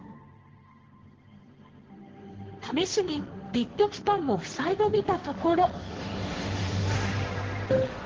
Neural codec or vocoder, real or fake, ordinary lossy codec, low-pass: codec, 16 kHz, 1.1 kbps, Voila-Tokenizer; fake; Opus, 16 kbps; 7.2 kHz